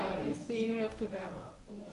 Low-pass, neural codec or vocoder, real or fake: 10.8 kHz; codec, 24 kHz, 0.9 kbps, WavTokenizer, medium speech release version 1; fake